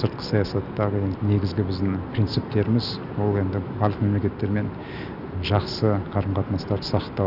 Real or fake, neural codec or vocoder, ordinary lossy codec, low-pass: real; none; none; 5.4 kHz